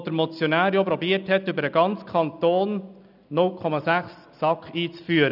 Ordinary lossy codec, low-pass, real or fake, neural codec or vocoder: none; 5.4 kHz; real; none